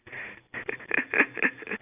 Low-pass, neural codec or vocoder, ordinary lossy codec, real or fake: 3.6 kHz; none; none; real